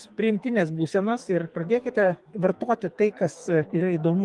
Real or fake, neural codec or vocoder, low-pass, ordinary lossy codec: fake; codec, 44.1 kHz, 2.6 kbps, SNAC; 10.8 kHz; Opus, 24 kbps